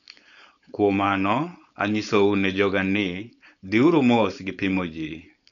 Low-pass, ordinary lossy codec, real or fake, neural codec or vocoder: 7.2 kHz; none; fake; codec, 16 kHz, 4.8 kbps, FACodec